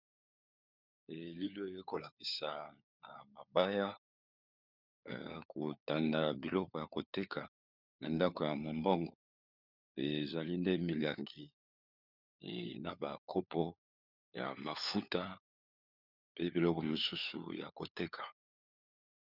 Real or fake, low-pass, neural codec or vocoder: fake; 5.4 kHz; codec, 16 kHz, 4 kbps, FunCodec, trained on LibriTTS, 50 frames a second